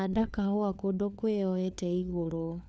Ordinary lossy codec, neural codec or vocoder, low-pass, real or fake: none; codec, 16 kHz, 4 kbps, FunCodec, trained on LibriTTS, 50 frames a second; none; fake